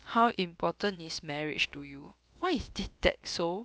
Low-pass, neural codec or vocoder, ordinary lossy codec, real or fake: none; codec, 16 kHz, about 1 kbps, DyCAST, with the encoder's durations; none; fake